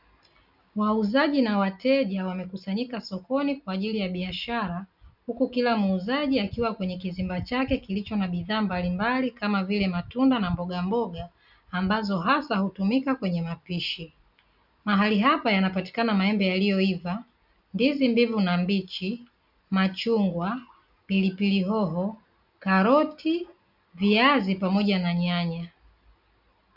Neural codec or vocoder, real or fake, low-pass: none; real; 5.4 kHz